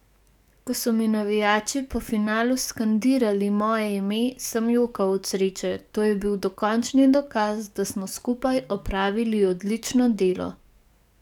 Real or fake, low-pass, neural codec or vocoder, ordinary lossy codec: fake; 19.8 kHz; codec, 44.1 kHz, 7.8 kbps, DAC; none